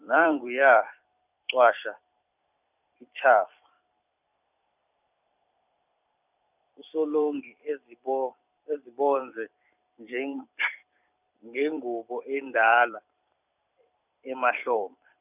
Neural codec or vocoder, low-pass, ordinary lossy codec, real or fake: vocoder, 44.1 kHz, 128 mel bands every 256 samples, BigVGAN v2; 3.6 kHz; none; fake